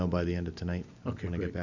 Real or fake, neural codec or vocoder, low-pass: real; none; 7.2 kHz